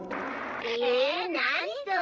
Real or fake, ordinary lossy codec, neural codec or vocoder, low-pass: fake; none; codec, 16 kHz, 16 kbps, FreqCodec, larger model; none